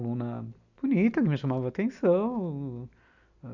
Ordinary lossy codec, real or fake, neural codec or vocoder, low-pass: none; real; none; 7.2 kHz